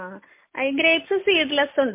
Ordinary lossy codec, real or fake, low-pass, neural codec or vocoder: MP3, 24 kbps; real; 3.6 kHz; none